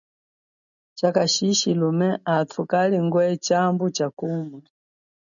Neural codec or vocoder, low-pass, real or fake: none; 7.2 kHz; real